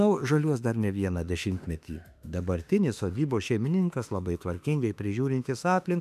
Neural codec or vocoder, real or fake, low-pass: autoencoder, 48 kHz, 32 numbers a frame, DAC-VAE, trained on Japanese speech; fake; 14.4 kHz